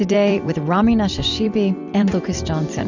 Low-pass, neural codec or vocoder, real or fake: 7.2 kHz; vocoder, 44.1 kHz, 128 mel bands every 256 samples, BigVGAN v2; fake